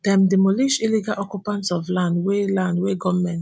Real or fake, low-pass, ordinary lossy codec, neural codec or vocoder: real; none; none; none